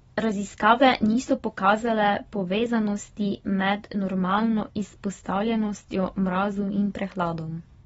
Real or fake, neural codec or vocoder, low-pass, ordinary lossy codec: real; none; 19.8 kHz; AAC, 24 kbps